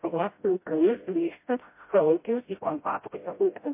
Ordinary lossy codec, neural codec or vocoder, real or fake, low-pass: MP3, 24 kbps; codec, 16 kHz, 0.5 kbps, FreqCodec, smaller model; fake; 3.6 kHz